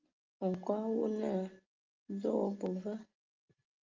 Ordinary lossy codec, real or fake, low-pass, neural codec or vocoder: Opus, 24 kbps; real; 7.2 kHz; none